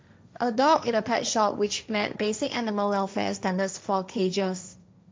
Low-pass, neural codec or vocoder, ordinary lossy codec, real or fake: none; codec, 16 kHz, 1.1 kbps, Voila-Tokenizer; none; fake